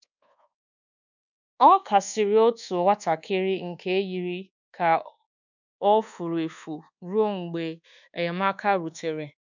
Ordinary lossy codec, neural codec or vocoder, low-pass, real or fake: none; codec, 24 kHz, 1.2 kbps, DualCodec; 7.2 kHz; fake